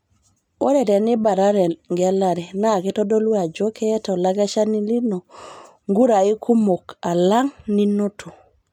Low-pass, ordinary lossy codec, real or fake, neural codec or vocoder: 19.8 kHz; none; real; none